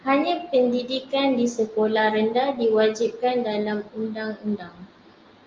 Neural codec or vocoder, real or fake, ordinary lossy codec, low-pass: none; real; Opus, 24 kbps; 7.2 kHz